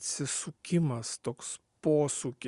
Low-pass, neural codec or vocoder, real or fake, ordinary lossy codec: 10.8 kHz; none; real; Opus, 64 kbps